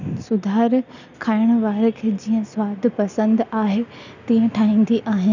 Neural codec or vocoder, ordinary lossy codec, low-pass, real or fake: none; none; 7.2 kHz; real